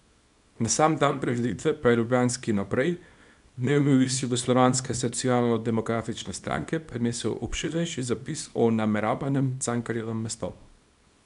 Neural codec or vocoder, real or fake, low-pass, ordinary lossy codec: codec, 24 kHz, 0.9 kbps, WavTokenizer, small release; fake; 10.8 kHz; MP3, 96 kbps